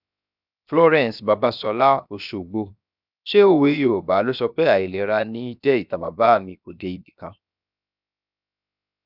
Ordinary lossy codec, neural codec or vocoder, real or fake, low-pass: none; codec, 16 kHz, 0.7 kbps, FocalCodec; fake; 5.4 kHz